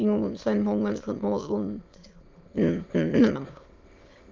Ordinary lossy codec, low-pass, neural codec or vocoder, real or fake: Opus, 24 kbps; 7.2 kHz; autoencoder, 22.05 kHz, a latent of 192 numbers a frame, VITS, trained on many speakers; fake